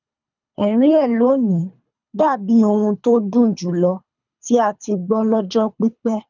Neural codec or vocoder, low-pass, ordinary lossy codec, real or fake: codec, 24 kHz, 3 kbps, HILCodec; 7.2 kHz; none; fake